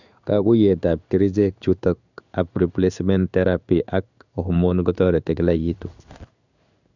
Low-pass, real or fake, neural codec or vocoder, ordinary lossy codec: 7.2 kHz; fake; codec, 16 kHz in and 24 kHz out, 1 kbps, XY-Tokenizer; none